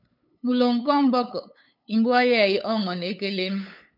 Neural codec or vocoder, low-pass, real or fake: codec, 16 kHz, 8 kbps, FunCodec, trained on LibriTTS, 25 frames a second; 5.4 kHz; fake